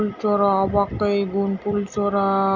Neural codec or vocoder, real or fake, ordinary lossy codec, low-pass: none; real; Opus, 64 kbps; 7.2 kHz